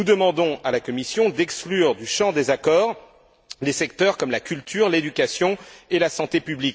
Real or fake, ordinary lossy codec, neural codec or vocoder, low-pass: real; none; none; none